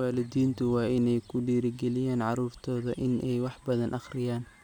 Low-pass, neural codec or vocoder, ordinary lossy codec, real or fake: 19.8 kHz; none; none; real